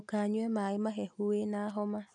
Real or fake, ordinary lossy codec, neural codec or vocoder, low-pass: real; none; none; 10.8 kHz